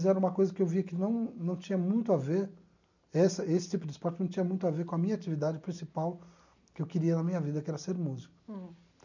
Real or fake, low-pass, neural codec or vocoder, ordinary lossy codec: real; 7.2 kHz; none; none